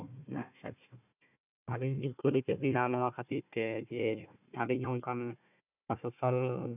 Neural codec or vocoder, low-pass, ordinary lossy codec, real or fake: codec, 16 kHz, 1 kbps, FunCodec, trained on Chinese and English, 50 frames a second; 3.6 kHz; none; fake